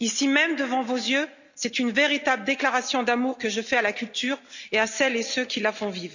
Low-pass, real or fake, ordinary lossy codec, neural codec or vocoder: 7.2 kHz; real; none; none